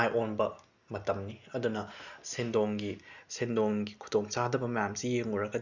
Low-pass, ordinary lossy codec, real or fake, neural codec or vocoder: 7.2 kHz; none; real; none